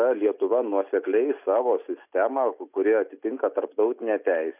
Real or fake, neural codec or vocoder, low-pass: real; none; 3.6 kHz